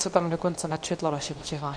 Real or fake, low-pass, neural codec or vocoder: fake; 9.9 kHz; codec, 24 kHz, 0.9 kbps, WavTokenizer, medium speech release version 2